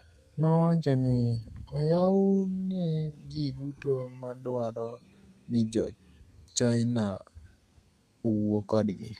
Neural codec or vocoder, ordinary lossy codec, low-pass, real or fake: codec, 32 kHz, 1.9 kbps, SNAC; none; 14.4 kHz; fake